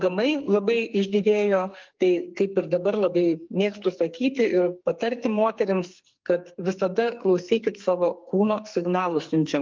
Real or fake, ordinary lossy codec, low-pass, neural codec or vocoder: fake; Opus, 24 kbps; 7.2 kHz; codec, 44.1 kHz, 2.6 kbps, SNAC